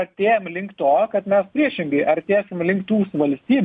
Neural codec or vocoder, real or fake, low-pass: none; real; 9.9 kHz